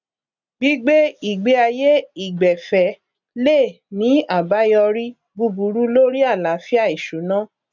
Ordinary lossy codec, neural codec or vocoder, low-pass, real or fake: none; none; 7.2 kHz; real